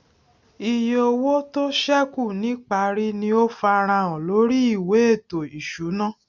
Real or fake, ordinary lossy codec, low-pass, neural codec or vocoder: real; none; 7.2 kHz; none